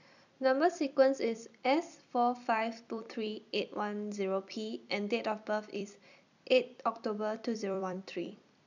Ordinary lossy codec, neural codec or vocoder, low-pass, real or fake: none; vocoder, 44.1 kHz, 128 mel bands every 256 samples, BigVGAN v2; 7.2 kHz; fake